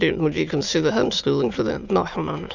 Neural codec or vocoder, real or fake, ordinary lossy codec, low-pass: autoencoder, 22.05 kHz, a latent of 192 numbers a frame, VITS, trained on many speakers; fake; Opus, 64 kbps; 7.2 kHz